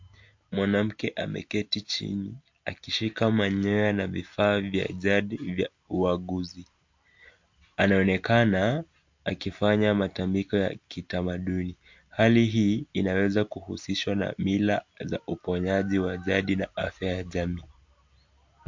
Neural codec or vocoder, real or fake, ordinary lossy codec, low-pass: none; real; MP3, 48 kbps; 7.2 kHz